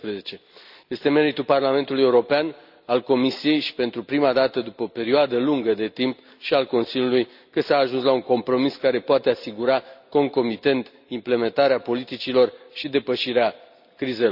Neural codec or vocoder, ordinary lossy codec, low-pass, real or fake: none; none; 5.4 kHz; real